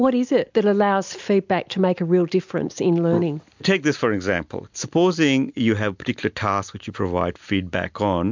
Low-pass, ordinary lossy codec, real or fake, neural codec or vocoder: 7.2 kHz; MP3, 64 kbps; real; none